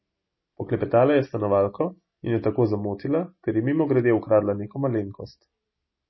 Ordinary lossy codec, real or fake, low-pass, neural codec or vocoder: MP3, 24 kbps; real; 7.2 kHz; none